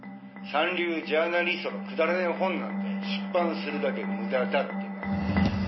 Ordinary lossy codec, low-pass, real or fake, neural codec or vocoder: MP3, 24 kbps; 7.2 kHz; real; none